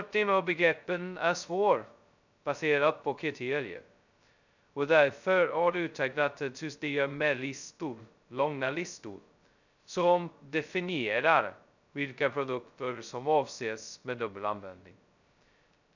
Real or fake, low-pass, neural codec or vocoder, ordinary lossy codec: fake; 7.2 kHz; codec, 16 kHz, 0.2 kbps, FocalCodec; none